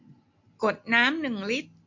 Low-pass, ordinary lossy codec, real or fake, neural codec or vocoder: 7.2 kHz; MP3, 48 kbps; real; none